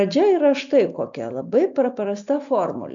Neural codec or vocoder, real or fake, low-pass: none; real; 7.2 kHz